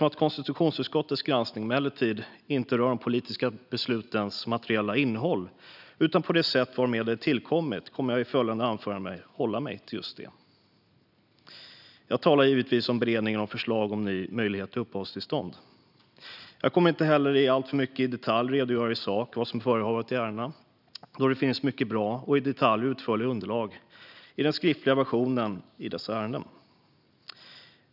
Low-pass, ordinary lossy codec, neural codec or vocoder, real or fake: 5.4 kHz; none; none; real